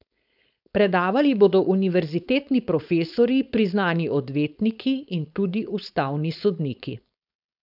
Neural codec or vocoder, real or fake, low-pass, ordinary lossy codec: codec, 16 kHz, 4.8 kbps, FACodec; fake; 5.4 kHz; none